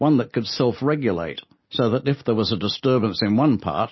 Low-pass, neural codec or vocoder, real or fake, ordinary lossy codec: 7.2 kHz; none; real; MP3, 24 kbps